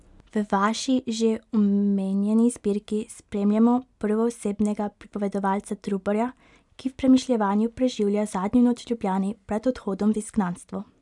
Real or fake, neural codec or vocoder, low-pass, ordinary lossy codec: real; none; 10.8 kHz; none